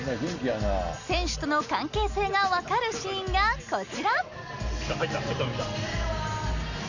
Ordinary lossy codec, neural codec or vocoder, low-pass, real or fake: none; none; 7.2 kHz; real